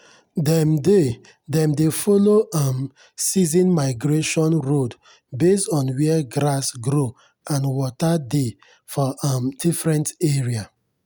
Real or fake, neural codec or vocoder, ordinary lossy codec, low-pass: real; none; none; none